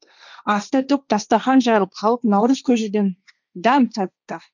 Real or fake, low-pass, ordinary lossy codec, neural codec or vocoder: fake; 7.2 kHz; none; codec, 16 kHz, 1.1 kbps, Voila-Tokenizer